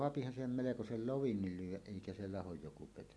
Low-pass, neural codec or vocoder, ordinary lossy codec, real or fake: none; none; none; real